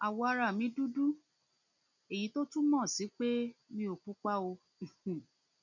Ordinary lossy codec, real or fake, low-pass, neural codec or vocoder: none; real; 7.2 kHz; none